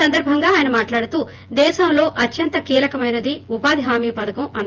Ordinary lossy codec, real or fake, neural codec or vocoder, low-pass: Opus, 32 kbps; fake; vocoder, 24 kHz, 100 mel bands, Vocos; 7.2 kHz